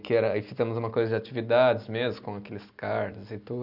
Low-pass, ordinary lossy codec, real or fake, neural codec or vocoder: 5.4 kHz; none; real; none